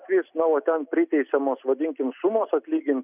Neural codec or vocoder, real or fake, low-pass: none; real; 3.6 kHz